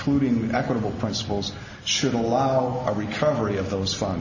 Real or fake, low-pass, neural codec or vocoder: real; 7.2 kHz; none